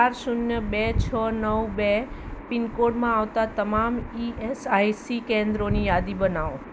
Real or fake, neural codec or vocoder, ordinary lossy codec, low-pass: real; none; none; none